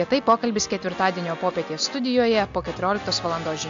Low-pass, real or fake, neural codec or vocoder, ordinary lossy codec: 7.2 kHz; real; none; AAC, 64 kbps